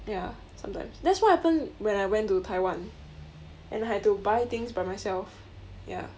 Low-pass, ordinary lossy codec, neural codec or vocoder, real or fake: none; none; none; real